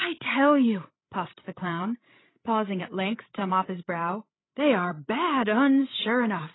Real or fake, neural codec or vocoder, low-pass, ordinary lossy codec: fake; codec, 16 kHz, 16 kbps, FreqCodec, larger model; 7.2 kHz; AAC, 16 kbps